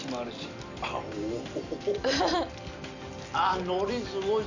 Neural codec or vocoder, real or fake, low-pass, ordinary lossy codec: none; real; 7.2 kHz; none